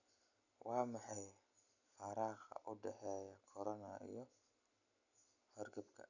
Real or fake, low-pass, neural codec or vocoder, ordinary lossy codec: real; 7.2 kHz; none; none